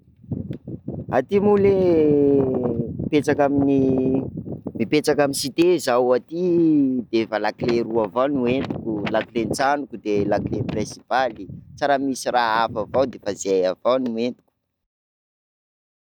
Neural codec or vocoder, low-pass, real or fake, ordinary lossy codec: none; 19.8 kHz; real; none